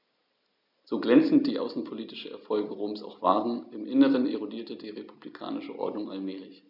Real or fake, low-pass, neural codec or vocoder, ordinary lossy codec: real; 5.4 kHz; none; none